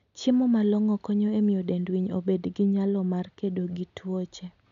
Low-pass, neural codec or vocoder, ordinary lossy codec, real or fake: 7.2 kHz; none; none; real